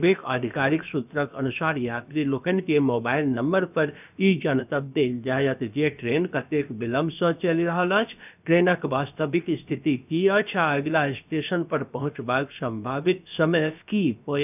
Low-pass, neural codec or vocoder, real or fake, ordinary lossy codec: 3.6 kHz; codec, 16 kHz, about 1 kbps, DyCAST, with the encoder's durations; fake; none